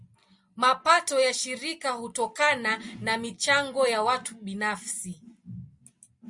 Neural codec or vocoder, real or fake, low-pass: none; real; 10.8 kHz